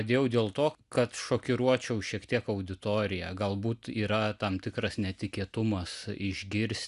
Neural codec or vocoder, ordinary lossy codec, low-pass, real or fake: none; Opus, 64 kbps; 10.8 kHz; real